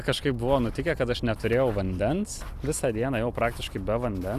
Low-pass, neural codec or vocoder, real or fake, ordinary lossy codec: 14.4 kHz; vocoder, 44.1 kHz, 128 mel bands every 256 samples, BigVGAN v2; fake; Opus, 64 kbps